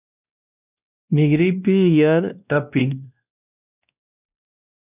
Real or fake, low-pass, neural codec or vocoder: fake; 3.6 kHz; codec, 16 kHz, 1 kbps, X-Codec, WavLM features, trained on Multilingual LibriSpeech